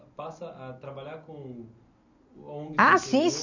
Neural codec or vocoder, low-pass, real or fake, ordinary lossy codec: none; 7.2 kHz; real; none